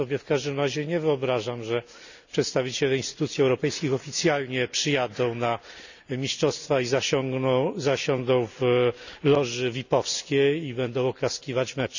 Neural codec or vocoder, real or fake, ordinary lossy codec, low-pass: none; real; none; 7.2 kHz